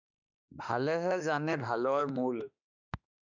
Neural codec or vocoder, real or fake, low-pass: autoencoder, 48 kHz, 32 numbers a frame, DAC-VAE, trained on Japanese speech; fake; 7.2 kHz